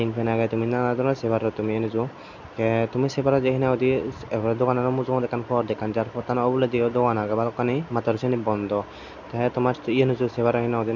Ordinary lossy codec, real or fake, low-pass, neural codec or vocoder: none; real; 7.2 kHz; none